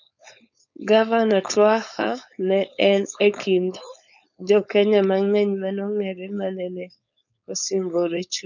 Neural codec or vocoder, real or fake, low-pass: codec, 16 kHz, 4.8 kbps, FACodec; fake; 7.2 kHz